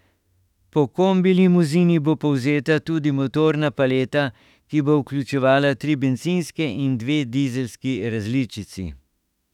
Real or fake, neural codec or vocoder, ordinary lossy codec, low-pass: fake; autoencoder, 48 kHz, 32 numbers a frame, DAC-VAE, trained on Japanese speech; none; 19.8 kHz